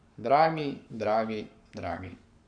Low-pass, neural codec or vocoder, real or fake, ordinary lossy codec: 9.9 kHz; codec, 44.1 kHz, 7.8 kbps, Pupu-Codec; fake; MP3, 96 kbps